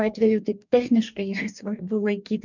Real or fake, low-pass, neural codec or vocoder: fake; 7.2 kHz; codec, 44.1 kHz, 2.6 kbps, DAC